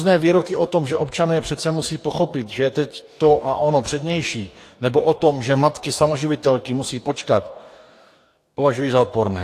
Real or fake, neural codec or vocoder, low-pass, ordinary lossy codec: fake; codec, 44.1 kHz, 2.6 kbps, DAC; 14.4 kHz; AAC, 64 kbps